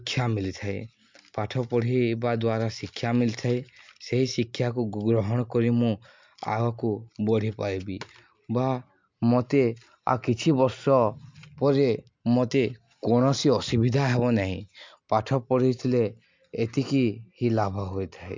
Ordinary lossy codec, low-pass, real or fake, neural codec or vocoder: MP3, 64 kbps; 7.2 kHz; real; none